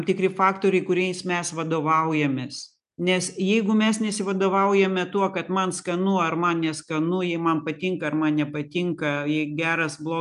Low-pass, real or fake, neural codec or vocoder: 10.8 kHz; real; none